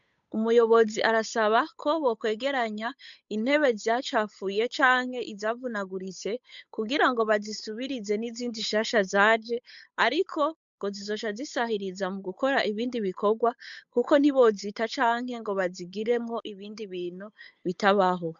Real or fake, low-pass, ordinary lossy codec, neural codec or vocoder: fake; 7.2 kHz; MP3, 64 kbps; codec, 16 kHz, 8 kbps, FunCodec, trained on Chinese and English, 25 frames a second